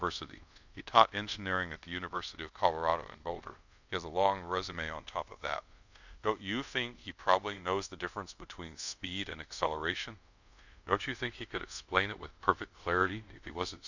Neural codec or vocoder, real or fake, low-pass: codec, 24 kHz, 0.5 kbps, DualCodec; fake; 7.2 kHz